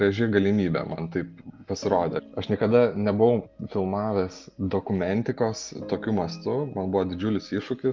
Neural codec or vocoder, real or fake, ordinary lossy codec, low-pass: none; real; Opus, 24 kbps; 7.2 kHz